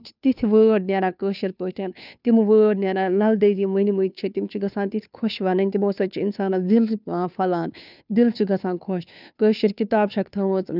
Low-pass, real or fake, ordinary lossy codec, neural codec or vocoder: 5.4 kHz; fake; none; codec, 16 kHz, 2 kbps, FunCodec, trained on Chinese and English, 25 frames a second